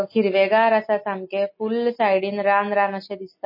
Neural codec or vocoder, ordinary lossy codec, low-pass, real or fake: none; MP3, 24 kbps; 5.4 kHz; real